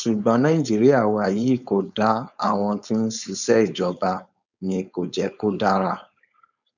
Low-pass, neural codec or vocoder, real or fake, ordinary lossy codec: 7.2 kHz; codec, 16 kHz, 4.8 kbps, FACodec; fake; none